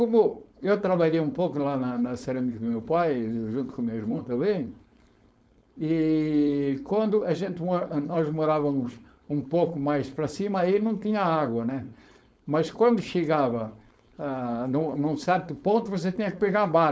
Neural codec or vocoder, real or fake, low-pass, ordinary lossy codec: codec, 16 kHz, 4.8 kbps, FACodec; fake; none; none